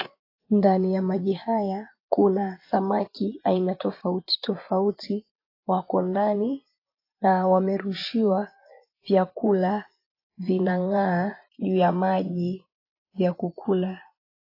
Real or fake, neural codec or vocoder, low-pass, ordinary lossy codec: fake; vocoder, 24 kHz, 100 mel bands, Vocos; 5.4 kHz; AAC, 32 kbps